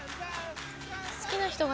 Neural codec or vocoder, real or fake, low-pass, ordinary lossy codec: none; real; none; none